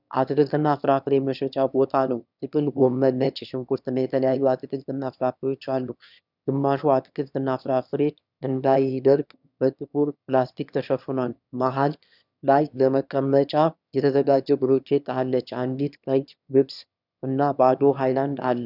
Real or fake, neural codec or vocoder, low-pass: fake; autoencoder, 22.05 kHz, a latent of 192 numbers a frame, VITS, trained on one speaker; 5.4 kHz